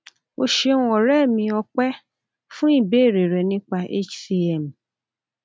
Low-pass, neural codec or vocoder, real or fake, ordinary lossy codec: none; none; real; none